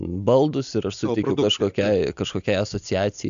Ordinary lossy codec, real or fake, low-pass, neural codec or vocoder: AAC, 96 kbps; real; 7.2 kHz; none